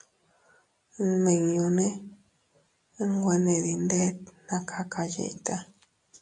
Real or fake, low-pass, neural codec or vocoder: real; 10.8 kHz; none